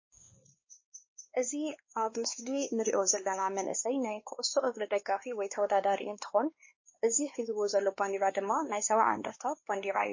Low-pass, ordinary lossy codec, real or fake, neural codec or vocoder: 7.2 kHz; MP3, 32 kbps; fake; codec, 16 kHz, 2 kbps, X-Codec, WavLM features, trained on Multilingual LibriSpeech